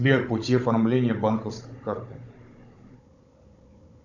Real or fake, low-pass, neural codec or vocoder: fake; 7.2 kHz; codec, 16 kHz, 16 kbps, FunCodec, trained on Chinese and English, 50 frames a second